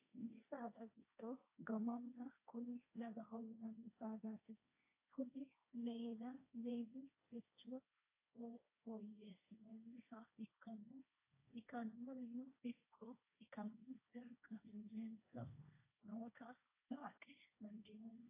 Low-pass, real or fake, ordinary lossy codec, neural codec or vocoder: 3.6 kHz; fake; AAC, 24 kbps; codec, 16 kHz, 1.1 kbps, Voila-Tokenizer